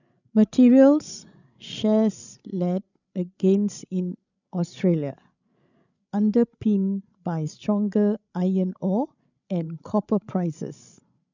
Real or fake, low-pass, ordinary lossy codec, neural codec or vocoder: fake; 7.2 kHz; none; codec, 16 kHz, 16 kbps, FreqCodec, larger model